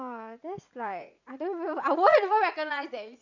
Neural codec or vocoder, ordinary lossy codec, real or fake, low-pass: vocoder, 44.1 kHz, 80 mel bands, Vocos; none; fake; 7.2 kHz